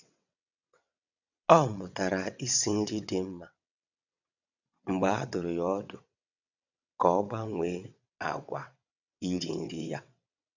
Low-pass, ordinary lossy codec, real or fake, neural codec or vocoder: 7.2 kHz; none; fake; vocoder, 22.05 kHz, 80 mel bands, WaveNeXt